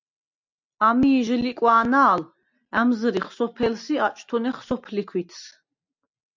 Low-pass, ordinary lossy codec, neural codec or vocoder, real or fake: 7.2 kHz; MP3, 64 kbps; none; real